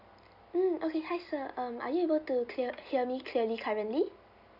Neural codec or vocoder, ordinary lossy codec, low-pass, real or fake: none; none; 5.4 kHz; real